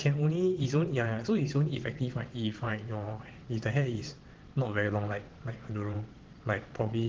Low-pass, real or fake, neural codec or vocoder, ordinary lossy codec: 7.2 kHz; fake; vocoder, 22.05 kHz, 80 mel bands, WaveNeXt; Opus, 16 kbps